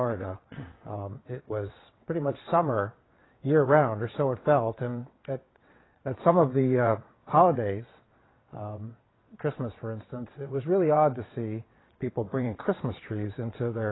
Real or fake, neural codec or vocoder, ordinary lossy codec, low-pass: fake; vocoder, 22.05 kHz, 80 mel bands, WaveNeXt; AAC, 16 kbps; 7.2 kHz